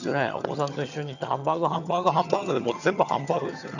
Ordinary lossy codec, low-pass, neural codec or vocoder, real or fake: none; 7.2 kHz; vocoder, 22.05 kHz, 80 mel bands, HiFi-GAN; fake